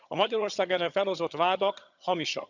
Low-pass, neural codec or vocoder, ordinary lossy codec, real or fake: 7.2 kHz; vocoder, 22.05 kHz, 80 mel bands, HiFi-GAN; none; fake